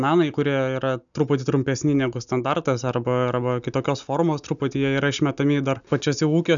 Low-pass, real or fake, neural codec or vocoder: 7.2 kHz; real; none